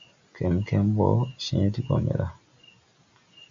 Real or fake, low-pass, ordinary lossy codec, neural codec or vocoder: real; 7.2 kHz; MP3, 48 kbps; none